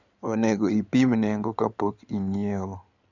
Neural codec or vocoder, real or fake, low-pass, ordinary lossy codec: codec, 16 kHz in and 24 kHz out, 2.2 kbps, FireRedTTS-2 codec; fake; 7.2 kHz; none